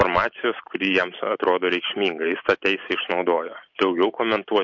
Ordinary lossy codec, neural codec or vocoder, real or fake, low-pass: MP3, 48 kbps; none; real; 7.2 kHz